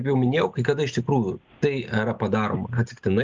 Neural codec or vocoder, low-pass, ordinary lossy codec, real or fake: none; 7.2 kHz; Opus, 24 kbps; real